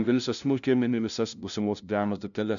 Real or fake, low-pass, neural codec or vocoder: fake; 7.2 kHz; codec, 16 kHz, 0.5 kbps, FunCodec, trained on LibriTTS, 25 frames a second